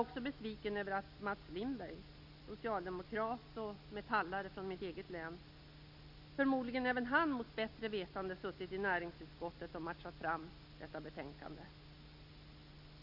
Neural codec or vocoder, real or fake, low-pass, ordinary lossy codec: none; real; 5.4 kHz; none